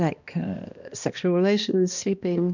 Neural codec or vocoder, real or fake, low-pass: codec, 16 kHz, 1 kbps, X-Codec, HuBERT features, trained on balanced general audio; fake; 7.2 kHz